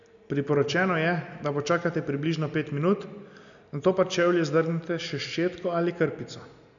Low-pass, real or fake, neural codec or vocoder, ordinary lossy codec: 7.2 kHz; real; none; none